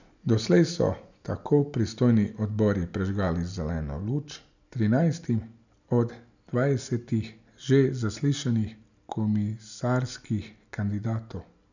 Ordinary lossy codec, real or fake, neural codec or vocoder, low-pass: none; real; none; 7.2 kHz